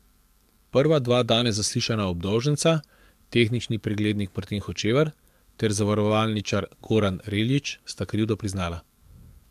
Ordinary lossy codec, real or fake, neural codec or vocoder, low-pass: MP3, 96 kbps; fake; codec, 44.1 kHz, 7.8 kbps, DAC; 14.4 kHz